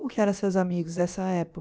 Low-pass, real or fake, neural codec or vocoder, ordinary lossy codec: none; fake; codec, 16 kHz, about 1 kbps, DyCAST, with the encoder's durations; none